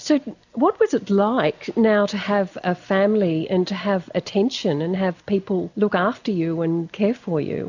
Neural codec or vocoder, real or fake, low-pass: none; real; 7.2 kHz